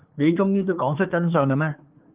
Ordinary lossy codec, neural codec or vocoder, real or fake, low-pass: Opus, 32 kbps; codec, 24 kHz, 1 kbps, SNAC; fake; 3.6 kHz